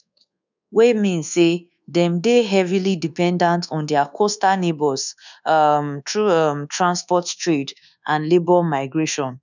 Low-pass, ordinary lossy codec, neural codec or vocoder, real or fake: 7.2 kHz; none; codec, 24 kHz, 1.2 kbps, DualCodec; fake